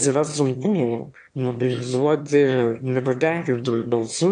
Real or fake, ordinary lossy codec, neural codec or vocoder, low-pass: fake; AAC, 64 kbps; autoencoder, 22.05 kHz, a latent of 192 numbers a frame, VITS, trained on one speaker; 9.9 kHz